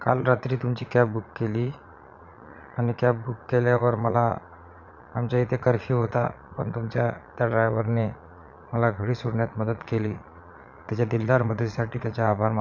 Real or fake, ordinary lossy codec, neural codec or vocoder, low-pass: fake; none; vocoder, 22.05 kHz, 80 mel bands, Vocos; 7.2 kHz